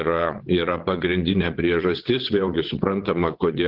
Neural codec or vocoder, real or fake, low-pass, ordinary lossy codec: vocoder, 22.05 kHz, 80 mel bands, WaveNeXt; fake; 5.4 kHz; Opus, 16 kbps